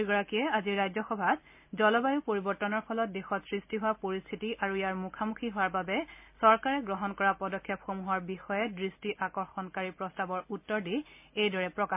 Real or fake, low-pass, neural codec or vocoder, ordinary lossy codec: real; 3.6 kHz; none; MP3, 32 kbps